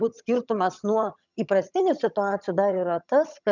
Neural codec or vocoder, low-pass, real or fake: vocoder, 22.05 kHz, 80 mel bands, HiFi-GAN; 7.2 kHz; fake